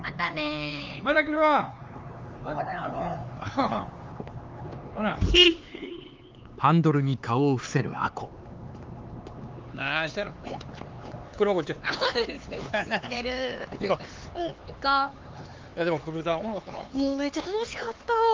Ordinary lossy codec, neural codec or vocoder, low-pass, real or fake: Opus, 32 kbps; codec, 16 kHz, 4 kbps, X-Codec, HuBERT features, trained on LibriSpeech; 7.2 kHz; fake